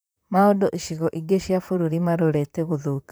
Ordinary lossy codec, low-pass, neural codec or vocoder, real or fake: none; none; vocoder, 44.1 kHz, 128 mel bands, Pupu-Vocoder; fake